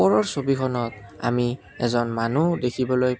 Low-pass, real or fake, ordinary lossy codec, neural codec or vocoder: none; real; none; none